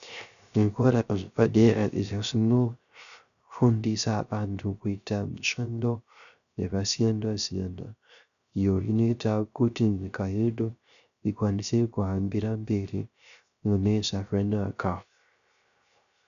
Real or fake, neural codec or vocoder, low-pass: fake; codec, 16 kHz, 0.3 kbps, FocalCodec; 7.2 kHz